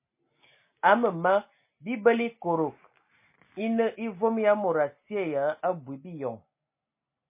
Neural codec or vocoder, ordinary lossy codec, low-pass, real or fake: none; MP3, 24 kbps; 3.6 kHz; real